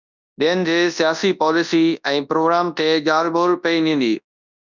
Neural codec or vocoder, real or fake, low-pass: codec, 24 kHz, 0.9 kbps, WavTokenizer, large speech release; fake; 7.2 kHz